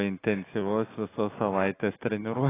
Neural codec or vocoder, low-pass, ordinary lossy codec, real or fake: none; 3.6 kHz; AAC, 16 kbps; real